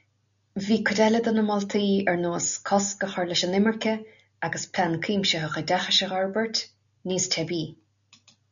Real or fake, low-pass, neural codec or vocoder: real; 7.2 kHz; none